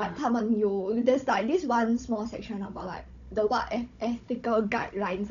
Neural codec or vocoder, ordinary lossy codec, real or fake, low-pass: codec, 16 kHz, 16 kbps, FunCodec, trained on Chinese and English, 50 frames a second; none; fake; 7.2 kHz